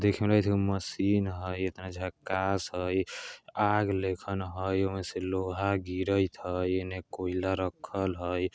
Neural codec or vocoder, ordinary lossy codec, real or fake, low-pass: none; none; real; none